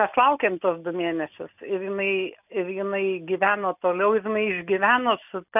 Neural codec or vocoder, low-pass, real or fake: none; 3.6 kHz; real